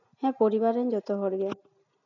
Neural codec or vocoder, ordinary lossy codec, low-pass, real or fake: none; none; 7.2 kHz; real